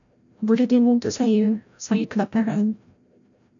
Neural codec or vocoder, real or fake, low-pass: codec, 16 kHz, 0.5 kbps, FreqCodec, larger model; fake; 7.2 kHz